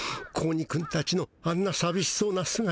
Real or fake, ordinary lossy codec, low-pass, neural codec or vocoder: real; none; none; none